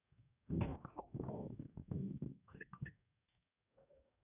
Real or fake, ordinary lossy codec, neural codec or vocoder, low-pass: fake; none; codec, 44.1 kHz, 2.6 kbps, DAC; 3.6 kHz